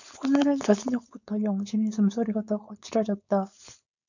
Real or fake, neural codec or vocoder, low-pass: fake; codec, 16 kHz, 4.8 kbps, FACodec; 7.2 kHz